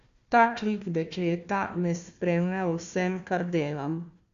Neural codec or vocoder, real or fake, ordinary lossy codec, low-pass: codec, 16 kHz, 1 kbps, FunCodec, trained on Chinese and English, 50 frames a second; fake; Opus, 64 kbps; 7.2 kHz